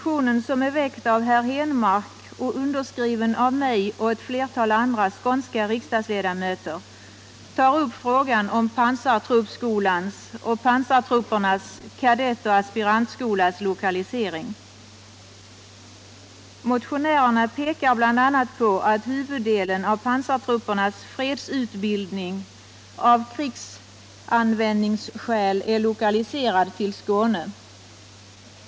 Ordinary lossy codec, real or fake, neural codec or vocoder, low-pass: none; real; none; none